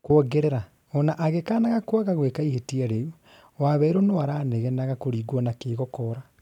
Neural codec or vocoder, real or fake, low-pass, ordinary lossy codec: none; real; 19.8 kHz; none